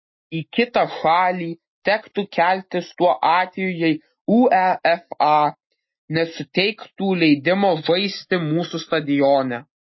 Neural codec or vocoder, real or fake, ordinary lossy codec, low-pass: none; real; MP3, 24 kbps; 7.2 kHz